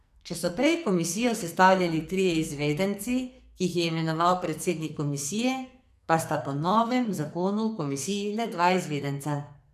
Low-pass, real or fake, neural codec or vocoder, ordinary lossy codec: 14.4 kHz; fake; codec, 44.1 kHz, 2.6 kbps, SNAC; none